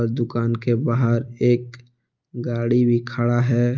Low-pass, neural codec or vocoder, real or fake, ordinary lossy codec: 7.2 kHz; none; real; Opus, 24 kbps